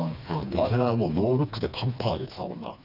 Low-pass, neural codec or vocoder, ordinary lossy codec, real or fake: 5.4 kHz; codec, 16 kHz, 2 kbps, FreqCodec, smaller model; none; fake